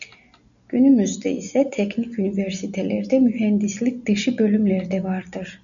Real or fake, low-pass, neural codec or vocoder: real; 7.2 kHz; none